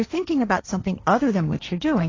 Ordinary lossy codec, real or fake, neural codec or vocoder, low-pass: AAC, 32 kbps; fake; codec, 16 kHz, 1.1 kbps, Voila-Tokenizer; 7.2 kHz